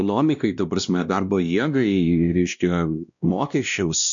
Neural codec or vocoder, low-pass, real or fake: codec, 16 kHz, 1 kbps, X-Codec, WavLM features, trained on Multilingual LibriSpeech; 7.2 kHz; fake